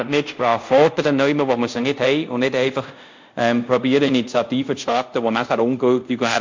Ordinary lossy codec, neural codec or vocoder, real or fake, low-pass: MP3, 64 kbps; codec, 24 kHz, 0.5 kbps, DualCodec; fake; 7.2 kHz